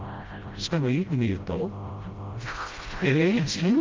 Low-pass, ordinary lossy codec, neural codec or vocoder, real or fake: 7.2 kHz; Opus, 32 kbps; codec, 16 kHz, 0.5 kbps, FreqCodec, smaller model; fake